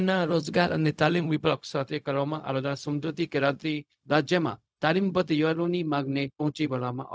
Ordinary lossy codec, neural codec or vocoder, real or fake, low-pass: none; codec, 16 kHz, 0.4 kbps, LongCat-Audio-Codec; fake; none